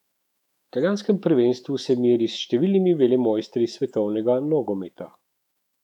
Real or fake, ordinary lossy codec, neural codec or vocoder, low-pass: fake; none; autoencoder, 48 kHz, 128 numbers a frame, DAC-VAE, trained on Japanese speech; 19.8 kHz